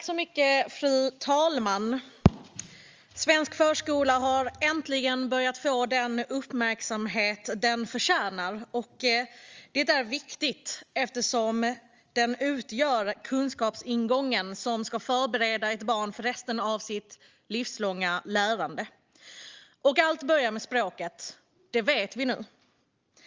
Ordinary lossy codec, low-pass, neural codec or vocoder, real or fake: Opus, 32 kbps; 7.2 kHz; none; real